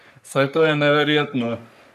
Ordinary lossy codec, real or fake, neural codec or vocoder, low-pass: none; fake; codec, 44.1 kHz, 3.4 kbps, Pupu-Codec; 14.4 kHz